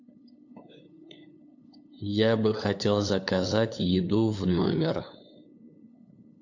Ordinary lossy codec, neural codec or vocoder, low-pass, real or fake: AAC, 48 kbps; codec, 16 kHz, 8 kbps, FunCodec, trained on LibriTTS, 25 frames a second; 7.2 kHz; fake